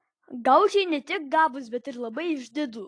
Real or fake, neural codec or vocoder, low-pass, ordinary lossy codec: real; none; 9.9 kHz; AAC, 48 kbps